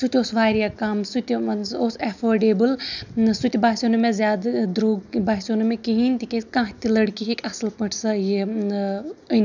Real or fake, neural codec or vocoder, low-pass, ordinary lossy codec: real; none; 7.2 kHz; none